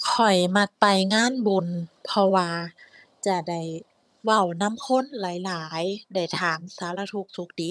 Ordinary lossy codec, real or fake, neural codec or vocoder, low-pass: none; fake; vocoder, 22.05 kHz, 80 mel bands, HiFi-GAN; none